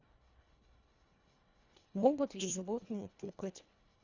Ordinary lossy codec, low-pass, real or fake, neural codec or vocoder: Opus, 64 kbps; 7.2 kHz; fake; codec, 24 kHz, 1.5 kbps, HILCodec